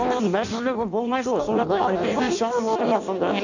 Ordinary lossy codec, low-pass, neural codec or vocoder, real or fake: none; 7.2 kHz; codec, 16 kHz in and 24 kHz out, 0.6 kbps, FireRedTTS-2 codec; fake